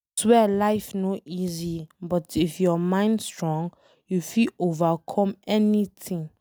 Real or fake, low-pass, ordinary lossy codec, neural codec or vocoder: real; none; none; none